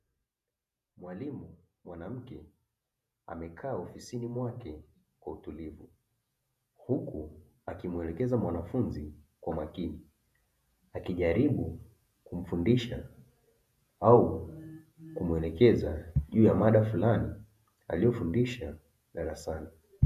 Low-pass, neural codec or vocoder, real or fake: 14.4 kHz; vocoder, 48 kHz, 128 mel bands, Vocos; fake